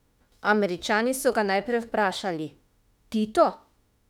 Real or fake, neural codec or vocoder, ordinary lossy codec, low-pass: fake; autoencoder, 48 kHz, 32 numbers a frame, DAC-VAE, trained on Japanese speech; none; 19.8 kHz